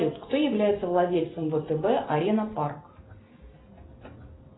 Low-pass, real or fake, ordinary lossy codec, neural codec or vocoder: 7.2 kHz; real; AAC, 16 kbps; none